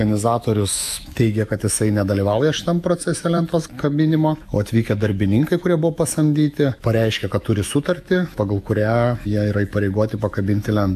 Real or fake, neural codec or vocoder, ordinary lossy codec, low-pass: fake; autoencoder, 48 kHz, 128 numbers a frame, DAC-VAE, trained on Japanese speech; AAC, 96 kbps; 14.4 kHz